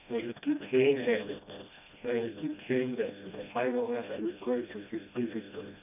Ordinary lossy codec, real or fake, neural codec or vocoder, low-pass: none; fake; codec, 16 kHz, 1 kbps, FreqCodec, smaller model; 3.6 kHz